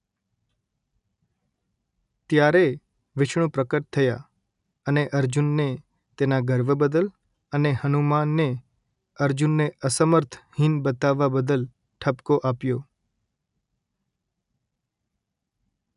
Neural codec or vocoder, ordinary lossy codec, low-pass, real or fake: none; none; 10.8 kHz; real